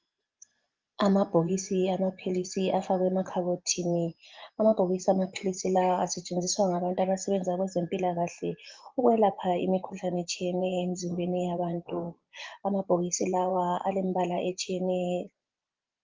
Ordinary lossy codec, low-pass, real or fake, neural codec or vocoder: Opus, 32 kbps; 7.2 kHz; fake; vocoder, 24 kHz, 100 mel bands, Vocos